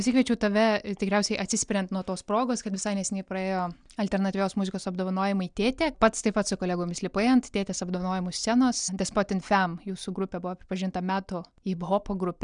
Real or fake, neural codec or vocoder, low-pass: real; none; 9.9 kHz